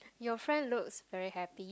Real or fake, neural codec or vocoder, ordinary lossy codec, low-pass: real; none; none; none